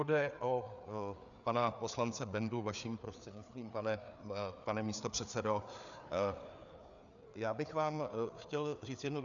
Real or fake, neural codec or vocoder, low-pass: fake; codec, 16 kHz, 4 kbps, FreqCodec, larger model; 7.2 kHz